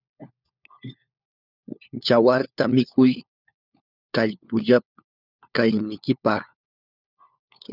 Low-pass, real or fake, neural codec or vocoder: 5.4 kHz; fake; codec, 16 kHz, 4 kbps, FunCodec, trained on LibriTTS, 50 frames a second